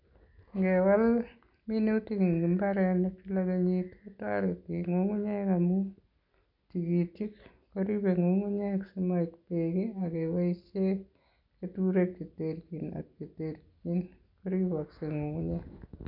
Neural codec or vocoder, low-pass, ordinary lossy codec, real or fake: none; 5.4 kHz; none; real